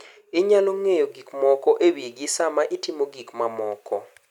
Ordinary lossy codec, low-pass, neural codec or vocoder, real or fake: none; 19.8 kHz; none; real